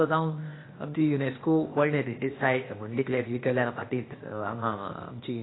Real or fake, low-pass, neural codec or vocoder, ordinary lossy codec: fake; 7.2 kHz; codec, 16 kHz, 0.8 kbps, ZipCodec; AAC, 16 kbps